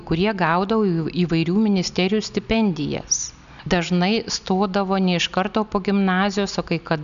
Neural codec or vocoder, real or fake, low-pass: none; real; 7.2 kHz